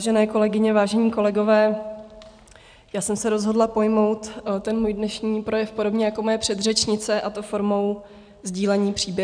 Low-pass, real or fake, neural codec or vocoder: 9.9 kHz; real; none